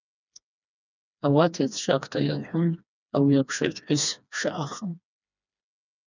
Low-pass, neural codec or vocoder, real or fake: 7.2 kHz; codec, 16 kHz, 2 kbps, FreqCodec, smaller model; fake